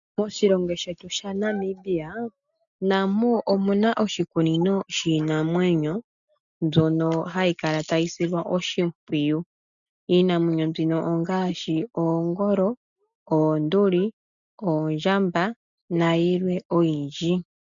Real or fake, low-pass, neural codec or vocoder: real; 7.2 kHz; none